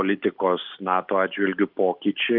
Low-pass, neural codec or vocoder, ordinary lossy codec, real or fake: 5.4 kHz; none; Opus, 24 kbps; real